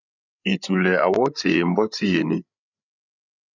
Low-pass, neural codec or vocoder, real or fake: 7.2 kHz; codec, 16 kHz, 8 kbps, FreqCodec, larger model; fake